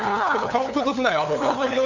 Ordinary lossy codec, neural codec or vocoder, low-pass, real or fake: none; codec, 16 kHz, 8 kbps, FunCodec, trained on LibriTTS, 25 frames a second; 7.2 kHz; fake